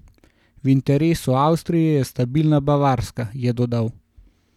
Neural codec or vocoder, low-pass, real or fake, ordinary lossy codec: none; 19.8 kHz; real; none